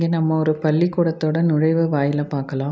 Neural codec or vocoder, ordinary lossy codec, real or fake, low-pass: none; none; real; none